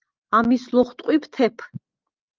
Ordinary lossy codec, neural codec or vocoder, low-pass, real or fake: Opus, 32 kbps; none; 7.2 kHz; real